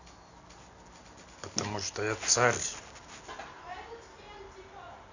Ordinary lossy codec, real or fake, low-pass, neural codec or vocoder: none; real; 7.2 kHz; none